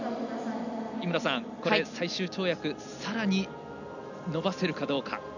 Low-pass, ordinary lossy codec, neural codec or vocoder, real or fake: 7.2 kHz; none; none; real